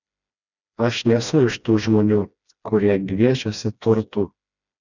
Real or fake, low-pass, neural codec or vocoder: fake; 7.2 kHz; codec, 16 kHz, 1 kbps, FreqCodec, smaller model